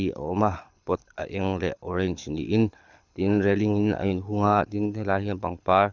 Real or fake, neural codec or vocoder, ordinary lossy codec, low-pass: fake; codec, 24 kHz, 6 kbps, HILCodec; none; 7.2 kHz